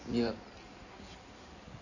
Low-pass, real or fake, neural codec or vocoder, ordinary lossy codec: 7.2 kHz; fake; codec, 16 kHz in and 24 kHz out, 2.2 kbps, FireRedTTS-2 codec; none